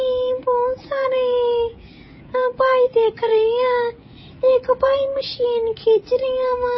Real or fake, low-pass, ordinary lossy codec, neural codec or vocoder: fake; 7.2 kHz; MP3, 24 kbps; codec, 16 kHz, 16 kbps, FreqCodec, smaller model